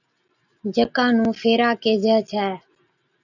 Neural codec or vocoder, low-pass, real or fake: none; 7.2 kHz; real